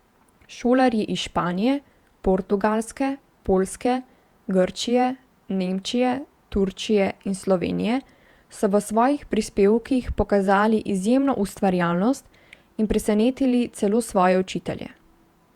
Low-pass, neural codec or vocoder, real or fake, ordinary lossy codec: 19.8 kHz; vocoder, 48 kHz, 128 mel bands, Vocos; fake; Opus, 64 kbps